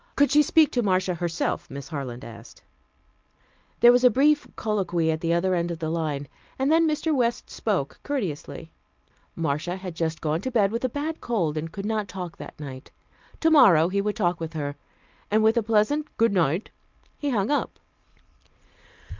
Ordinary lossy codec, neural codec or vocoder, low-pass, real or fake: Opus, 24 kbps; none; 7.2 kHz; real